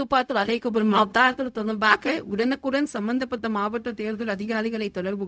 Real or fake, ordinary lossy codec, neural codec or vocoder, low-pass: fake; none; codec, 16 kHz, 0.4 kbps, LongCat-Audio-Codec; none